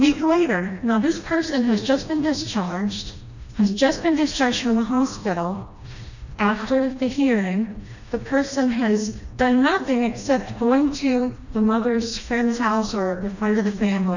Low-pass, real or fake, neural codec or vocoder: 7.2 kHz; fake; codec, 16 kHz, 1 kbps, FreqCodec, smaller model